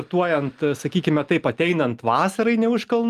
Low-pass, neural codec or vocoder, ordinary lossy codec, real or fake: 14.4 kHz; none; Opus, 32 kbps; real